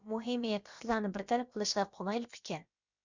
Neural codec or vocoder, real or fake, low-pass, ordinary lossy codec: codec, 16 kHz, about 1 kbps, DyCAST, with the encoder's durations; fake; 7.2 kHz; Opus, 64 kbps